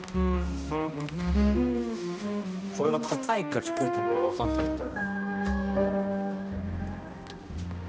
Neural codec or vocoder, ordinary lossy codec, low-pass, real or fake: codec, 16 kHz, 1 kbps, X-Codec, HuBERT features, trained on balanced general audio; none; none; fake